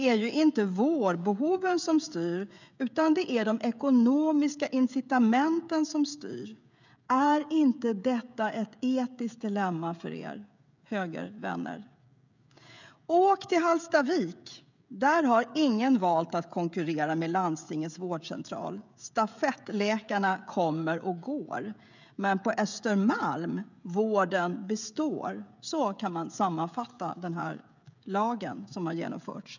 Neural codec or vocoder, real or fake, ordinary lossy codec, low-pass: codec, 16 kHz, 16 kbps, FreqCodec, smaller model; fake; none; 7.2 kHz